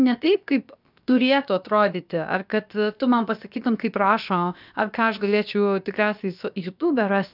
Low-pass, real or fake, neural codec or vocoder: 5.4 kHz; fake; codec, 16 kHz, about 1 kbps, DyCAST, with the encoder's durations